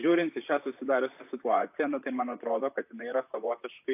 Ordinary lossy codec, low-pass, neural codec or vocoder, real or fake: AAC, 32 kbps; 3.6 kHz; vocoder, 44.1 kHz, 128 mel bands, Pupu-Vocoder; fake